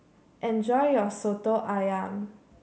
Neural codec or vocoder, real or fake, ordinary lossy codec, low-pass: none; real; none; none